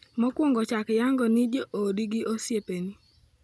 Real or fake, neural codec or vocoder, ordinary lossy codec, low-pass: real; none; none; none